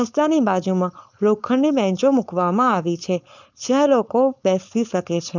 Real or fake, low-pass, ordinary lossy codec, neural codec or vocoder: fake; 7.2 kHz; none; codec, 16 kHz, 4.8 kbps, FACodec